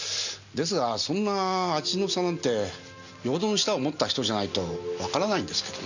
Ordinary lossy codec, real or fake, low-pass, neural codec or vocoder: none; real; 7.2 kHz; none